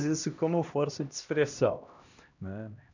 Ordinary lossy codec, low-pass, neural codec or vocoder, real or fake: none; 7.2 kHz; codec, 16 kHz, 1 kbps, X-Codec, HuBERT features, trained on LibriSpeech; fake